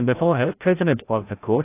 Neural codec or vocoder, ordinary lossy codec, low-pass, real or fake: codec, 16 kHz, 0.5 kbps, FreqCodec, larger model; AAC, 24 kbps; 3.6 kHz; fake